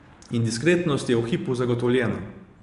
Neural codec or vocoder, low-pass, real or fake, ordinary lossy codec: vocoder, 24 kHz, 100 mel bands, Vocos; 10.8 kHz; fake; none